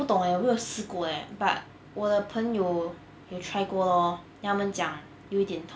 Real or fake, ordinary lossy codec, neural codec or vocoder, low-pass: real; none; none; none